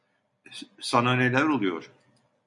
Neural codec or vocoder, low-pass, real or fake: none; 10.8 kHz; real